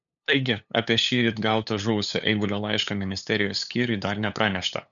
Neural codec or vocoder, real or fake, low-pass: codec, 16 kHz, 8 kbps, FunCodec, trained on LibriTTS, 25 frames a second; fake; 7.2 kHz